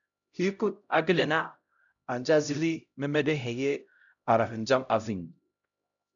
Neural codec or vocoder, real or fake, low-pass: codec, 16 kHz, 0.5 kbps, X-Codec, HuBERT features, trained on LibriSpeech; fake; 7.2 kHz